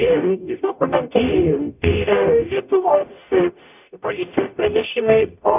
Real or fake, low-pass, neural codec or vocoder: fake; 3.6 kHz; codec, 44.1 kHz, 0.9 kbps, DAC